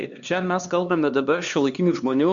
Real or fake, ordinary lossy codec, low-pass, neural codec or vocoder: fake; Opus, 64 kbps; 7.2 kHz; codec, 16 kHz, 2 kbps, X-Codec, HuBERT features, trained on LibriSpeech